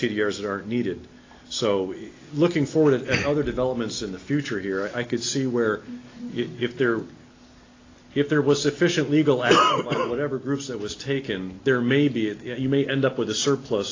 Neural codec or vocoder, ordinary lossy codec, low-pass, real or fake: none; AAC, 32 kbps; 7.2 kHz; real